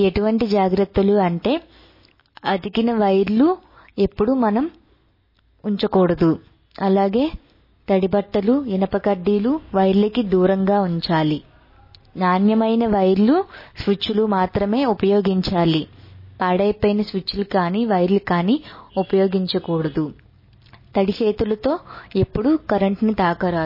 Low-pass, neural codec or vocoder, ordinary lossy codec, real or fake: 5.4 kHz; none; MP3, 24 kbps; real